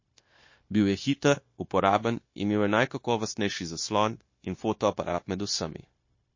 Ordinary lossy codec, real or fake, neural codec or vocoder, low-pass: MP3, 32 kbps; fake; codec, 16 kHz, 0.9 kbps, LongCat-Audio-Codec; 7.2 kHz